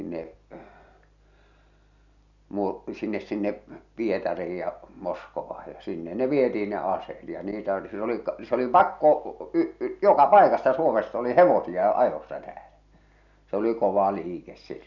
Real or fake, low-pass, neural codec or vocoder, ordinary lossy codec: real; 7.2 kHz; none; none